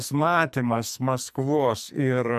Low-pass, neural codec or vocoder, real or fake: 14.4 kHz; codec, 44.1 kHz, 2.6 kbps, SNAC; fake